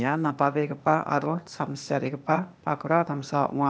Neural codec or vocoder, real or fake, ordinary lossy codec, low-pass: codec, 16 kHz, 0.8 kbps, ZipCodec; fake; none; none